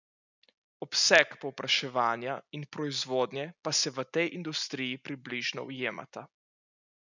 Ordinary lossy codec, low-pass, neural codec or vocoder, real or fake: none; 7.2 kHz; none; real